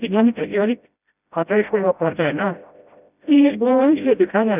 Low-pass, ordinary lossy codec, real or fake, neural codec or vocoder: 3.6 kHz; none; fake; codec, 16 kHz, 0.5 kbps, FreqCodec, smaller model